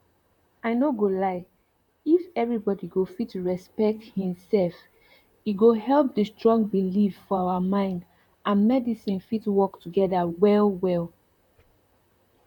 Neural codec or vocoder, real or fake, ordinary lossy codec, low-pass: vocoder, 44.1 kHz, 128 mel bands, Pupu-Vocoder; fake; none; 19.8 kHz